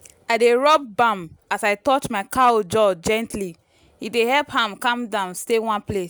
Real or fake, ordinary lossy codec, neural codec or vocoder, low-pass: real; none; none; none